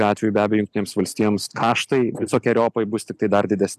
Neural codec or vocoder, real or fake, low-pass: none; real; 14.4 kHz